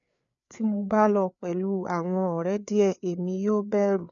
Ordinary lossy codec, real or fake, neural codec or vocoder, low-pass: none; fake; codec, 16 kHz, 4 kbps, FreqCodec, larger model; 7.2 kHz